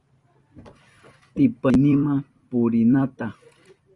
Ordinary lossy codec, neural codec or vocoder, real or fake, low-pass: Opus, 64 kbps; vocoder, 44.1 kHz, 128 mel bands every 256 samples, BigVGAN v2; fake; 10.8 kHz